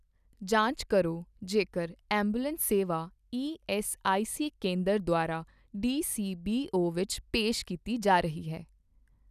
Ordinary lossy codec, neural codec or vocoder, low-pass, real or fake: none; none; 14.4 kHz; real